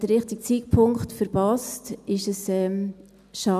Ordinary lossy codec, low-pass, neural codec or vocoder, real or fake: none; 14.4 kHz; none; real